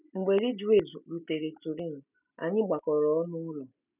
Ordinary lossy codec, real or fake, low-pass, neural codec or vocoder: none; real; 3.6 kHz; none